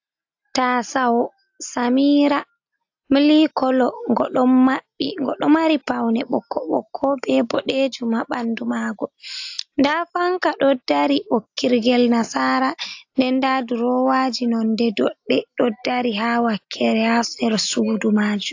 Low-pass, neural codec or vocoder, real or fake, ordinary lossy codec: 7.2 kHz; none; real; AAC, 48 kbps